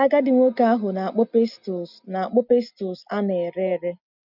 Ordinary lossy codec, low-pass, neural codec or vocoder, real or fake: none; 5.4 kHz; none; real